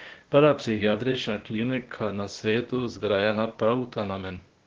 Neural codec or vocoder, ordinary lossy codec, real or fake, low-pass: codec, 16 kHz, 0.8 kbps, ZipCodec; Opus, 16 kbps; fake; 7.2 kHz